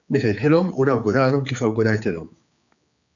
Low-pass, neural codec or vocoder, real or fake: 7.2 kHz; codec, 16 kHz, 4 kbps, X-Codec, HuBERT features, trained on general audio; fake